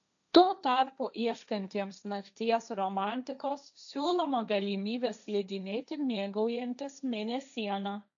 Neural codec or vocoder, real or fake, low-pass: codec, 16 kHz, 1.1 kbps, Voila-Tokenizer; fake; 7.2 kHz